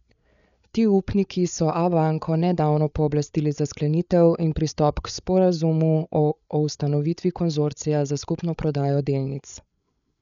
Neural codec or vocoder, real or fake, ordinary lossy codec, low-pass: codec, 16 kHz, 8 kbps, FreqCodec, larger model; fake; none; 7.2 kHz